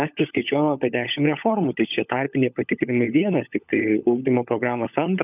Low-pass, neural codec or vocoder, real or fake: 3.6 kHz; codec, 16 kHz, 16 kbps, FunCodec, trained on LibriTTS, 50 frames a second; fake